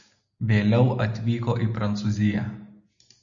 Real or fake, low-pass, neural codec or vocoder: real; 7.2 kHz; none